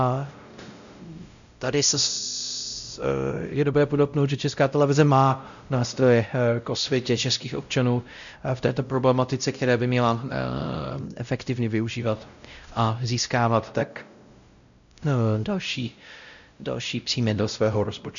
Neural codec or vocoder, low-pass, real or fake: codec, 16 kHz, 0.5 kbps, X-Codec, WavLM features, trained on Multilingual LibriSpeech; 7.2 kHz; fake